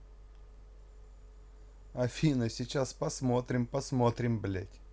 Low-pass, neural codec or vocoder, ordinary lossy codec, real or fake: none; none; none; real